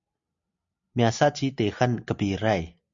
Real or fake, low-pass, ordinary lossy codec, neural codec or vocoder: real; 7.2 kHz; Opus, 64 kbps; none